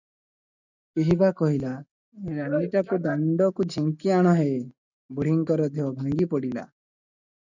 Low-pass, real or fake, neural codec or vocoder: 7.2 kHz; real; none